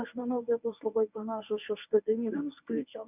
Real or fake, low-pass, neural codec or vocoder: fake; 3.6 kHz; codec, 24 kHz, 0.9 kbps, WavTokenizer, medium speech release version 1